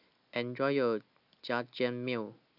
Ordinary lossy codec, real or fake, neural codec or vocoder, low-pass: AAC, 48 kbps; real; none; 5.4 kHz